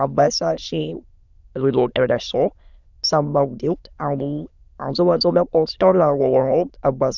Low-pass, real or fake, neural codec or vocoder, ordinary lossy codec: 7.2 kHz; fake; autoencoder, 22.05 kHz, a latent of 192 numbers a frame, VITS, trained on many speakers; none